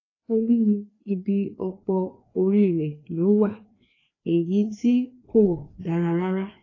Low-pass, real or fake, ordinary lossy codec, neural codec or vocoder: 7.2 kHz; fake; AAC, 32 kbps; codec, 16 kHz, 2 kbps, FreqCodec, larger model